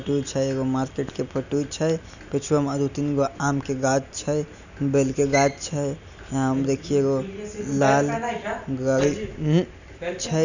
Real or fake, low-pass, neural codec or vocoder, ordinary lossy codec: real; 7.2 kHz; none; none